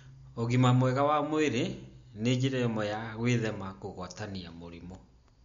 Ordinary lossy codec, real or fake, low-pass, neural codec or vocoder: MP3, 48 kbps; real; 7.2 kHz; none